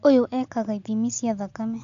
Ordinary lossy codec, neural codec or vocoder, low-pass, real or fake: none; none; 7.2 kHz; real